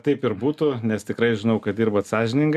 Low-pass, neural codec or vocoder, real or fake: 14.4 kHz; none; real